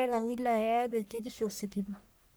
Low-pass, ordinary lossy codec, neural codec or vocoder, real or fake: none; none; codec, 44.1 kHz, 1.7 kbps, Pupu-Codec; fake